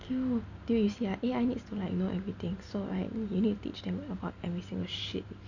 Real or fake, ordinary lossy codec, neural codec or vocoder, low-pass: real; none; none; 7.2 kHz